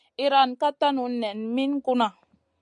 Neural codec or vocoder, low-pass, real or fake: none; 9.9 kHz; real